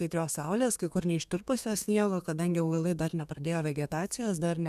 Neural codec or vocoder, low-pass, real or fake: codec, 32 kHz, 1.9 kbps, SNAC; 14.4 kHz; fake